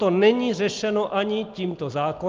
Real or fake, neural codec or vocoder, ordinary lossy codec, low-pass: real; none; Opus, 32 kbps; 7.2 kHz